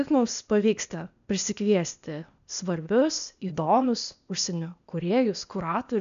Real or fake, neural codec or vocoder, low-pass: fake; codec, 16 kHz, 0.8 kbps, ZipCodec; 7.2 kHz